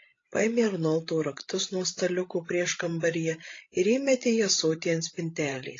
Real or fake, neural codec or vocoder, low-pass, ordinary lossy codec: fake; codec, 16 kHz, 16 kbps, FreqCodec, larger model; 7.2 kHz; AAC, 32 kbps